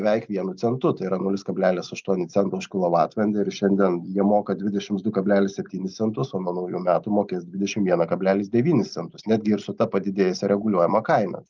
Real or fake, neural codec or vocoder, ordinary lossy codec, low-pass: real; none; Opus, 24 kbps; 7.2 kHz